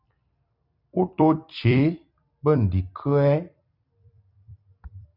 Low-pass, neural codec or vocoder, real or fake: 5.4 kHz; vocoder, 44.1 kHz, 128 mel bands every 256 samples, BigVGAN v2; fake